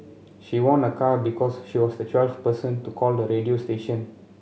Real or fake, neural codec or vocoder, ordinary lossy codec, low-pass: real; none; none; none